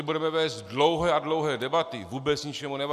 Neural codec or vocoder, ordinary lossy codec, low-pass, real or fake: none; AAC, 96 kbps; 14.4 kHz; real